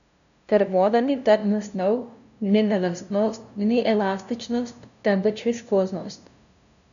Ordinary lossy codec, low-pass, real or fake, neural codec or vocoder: none; 7.2 kHz; fake; codec, 16 kHz, 0.5 kbps, FunCodec, trained on LibriTTS, 25 frames a second